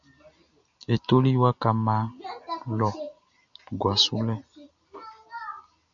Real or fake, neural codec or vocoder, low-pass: real; none; 7.2 kHz